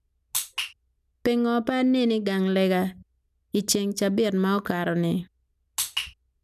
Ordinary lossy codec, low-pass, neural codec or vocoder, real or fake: none; 14.4 kHz; none; real